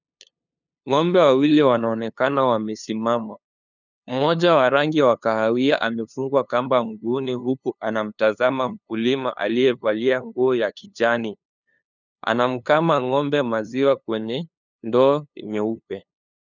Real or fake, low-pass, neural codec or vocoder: fake; 7.2 kHz; codec, 16 kHz, 2 kbps, FunCodec, trained on LibriTTS, 25 frames a second